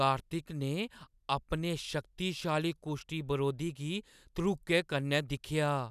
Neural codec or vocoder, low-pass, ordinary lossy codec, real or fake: none; 14.4 kHz; none; real